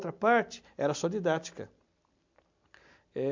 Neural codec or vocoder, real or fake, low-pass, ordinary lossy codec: none; real; 7.2 kHz; MP3, 64 kbps